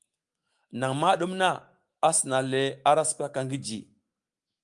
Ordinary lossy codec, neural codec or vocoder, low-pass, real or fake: Opus, 32 kbps; autoencoder, 48 kHz, 128 numbers a frame, DAC-VAE, trained on Japanese speech; 10.8 kHz; fake